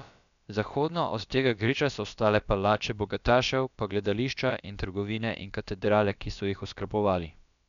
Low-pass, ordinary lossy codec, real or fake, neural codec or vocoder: 7.2 kHz; none; fake; codec, 16 kHz, about 1 kbps, DyCAST, with the encoder's durations